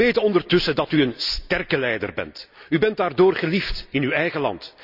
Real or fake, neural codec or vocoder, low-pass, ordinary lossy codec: real; none; 5.4 kHz; none